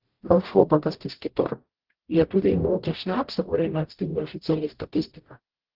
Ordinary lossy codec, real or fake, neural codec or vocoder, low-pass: Opus, 16 kbps; fake; codec, 44.1 kHz, 0.9 kbps, DAC; 5.4 kHz